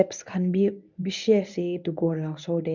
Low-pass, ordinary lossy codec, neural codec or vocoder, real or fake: 7.2 kHz; none; codec, 24 kHz, 0.9 kbps, WavTokenizer, medium speech release version 1; fake